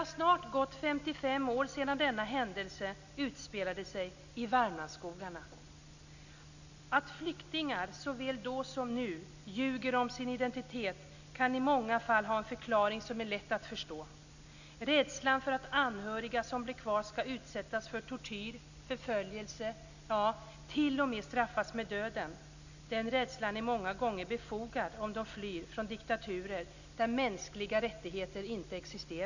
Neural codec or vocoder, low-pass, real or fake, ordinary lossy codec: none; 7.2 kHz; real; none